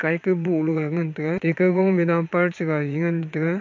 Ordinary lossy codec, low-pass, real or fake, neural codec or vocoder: MP3, 48 kbps; 7.2 kHz; fake; vocoder, 22.05 kHz, 80 mel bands, Vocos